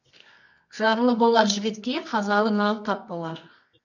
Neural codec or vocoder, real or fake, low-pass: codec, 24 kHz, 0.9 kbps, WavTokenizer, medium music audio release; fake; 7.2 kHz